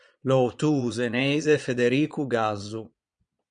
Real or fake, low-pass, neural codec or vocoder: fake; 9.9 kHz; vocoder, 22.05 kHz, 80 mel bands, Vocos